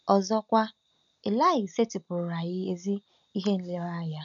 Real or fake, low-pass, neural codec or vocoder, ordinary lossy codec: real; 7.2 kHz; none; none